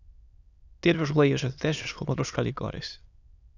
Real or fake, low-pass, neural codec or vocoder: fake; 7.2 kHz; autoencoder, 22.05 kHz, a latent of 192 numbers a frame, VITS, trained on many speakers